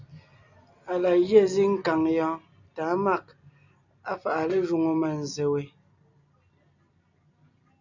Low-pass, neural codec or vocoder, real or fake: 7.2 kHz; none; real